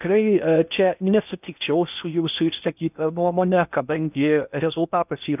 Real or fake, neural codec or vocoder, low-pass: fake; codec, 16 kHz in and 24 kHz out, 0.6 kbps, FocalCodec, streaming, 4096 codes; 3.6 kHz